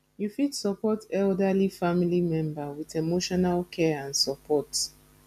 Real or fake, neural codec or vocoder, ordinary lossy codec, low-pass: real; none; none; 14.4 kHz